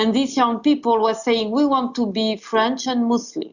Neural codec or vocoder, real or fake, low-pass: none; real; 7.2 kHz